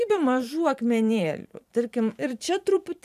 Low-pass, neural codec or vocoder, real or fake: 14.4 kHz; vocoder, 44.1 kHz, 128 mel bands every 512 samples, BigVGAN v2; fake